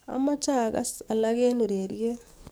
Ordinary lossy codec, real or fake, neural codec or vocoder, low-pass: none; fake; codec, 44.1 kHz, 7.8 kbps, DAC; none